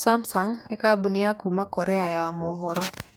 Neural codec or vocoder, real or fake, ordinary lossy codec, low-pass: codec, 44.1 kHz, 2.6 kbps, DAC; fake; none; none